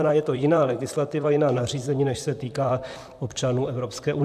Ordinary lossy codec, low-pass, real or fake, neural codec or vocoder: AAC, 96 kbps; 14.4 kHz; fake; vocoder, 44.1 kHz, 128 mel bands, Pupu-Vocoder